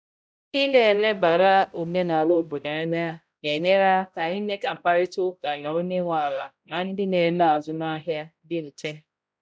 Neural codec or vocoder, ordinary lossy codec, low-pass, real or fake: codec, 16 kHz, 0.5 kbps, X-Codec, HuBERT features, trained on balanced general audio; none; none; fake